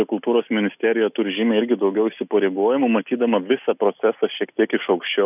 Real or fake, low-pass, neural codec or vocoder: real; 3.6 kHz; none